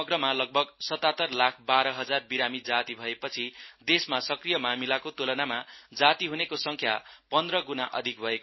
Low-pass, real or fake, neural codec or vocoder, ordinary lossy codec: 7.2 kHz; real; none; MP3, 24 kbps